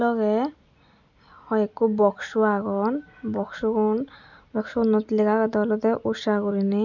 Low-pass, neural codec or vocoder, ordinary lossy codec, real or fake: 7.2 kHz; none; none; real